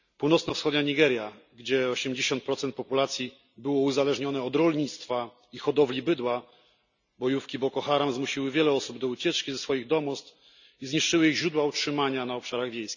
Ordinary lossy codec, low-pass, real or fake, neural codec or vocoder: none; 7.2 kHz; real; none